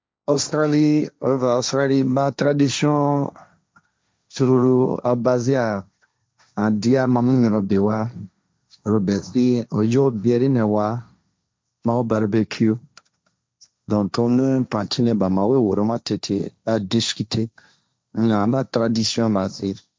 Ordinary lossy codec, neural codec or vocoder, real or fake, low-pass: none; codec, 16 kHz, 1.1 kbps, Voila-Tokenizer; fake; none